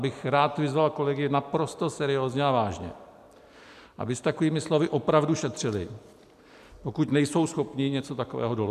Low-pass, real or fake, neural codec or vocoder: 14.4 kHz; real; none